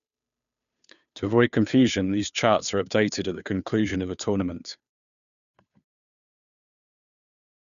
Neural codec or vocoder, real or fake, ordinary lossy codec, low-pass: codec, 16 kHz, 2 kbps, FunCodec, trained on Chinese and English, 25 frames a second; fake; none; 7.2 kHz